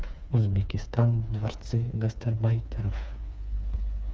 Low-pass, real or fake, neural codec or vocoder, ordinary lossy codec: none; fake; codec, 16 kHz, 4 kbps, FreqCodec, smaller model; none